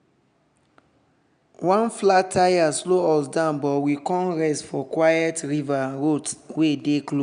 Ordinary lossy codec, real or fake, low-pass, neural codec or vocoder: none; real; 9.9 kHz; none